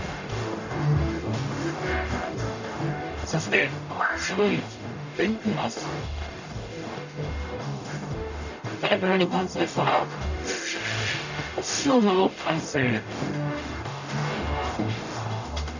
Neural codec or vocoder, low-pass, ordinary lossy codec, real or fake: codec, 44.1 kHz, 0.9 kbps, DAC; 7.2 kHz; none; fake